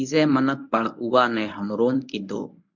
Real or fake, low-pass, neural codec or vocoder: fake; 7.2 kHz; codec, 24 kHz, 0.9 kbps, WavTokenizer, medium speech release version 1